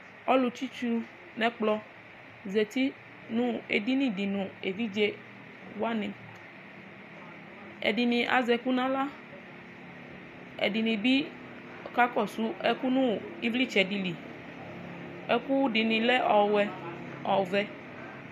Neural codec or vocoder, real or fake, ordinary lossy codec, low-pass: none; real; MP3, 96 kbps; 14.4 kHz